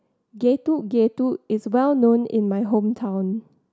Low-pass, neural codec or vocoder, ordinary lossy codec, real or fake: none; none; none; real